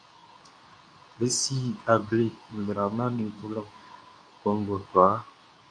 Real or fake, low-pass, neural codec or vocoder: fake; 9.9 kHz; codec, 24 kHz, 0.9 kbps, WavTokenizer, medium speech release version 2